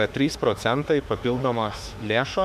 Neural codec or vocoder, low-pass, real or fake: autoencoder, 48 kHz, 32 numbers a frame, DAC-VAE, trained on Japanese speech; 14.4 kHz; fake